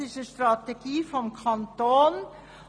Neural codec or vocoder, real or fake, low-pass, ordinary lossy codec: none; real; 9.9 kHz; none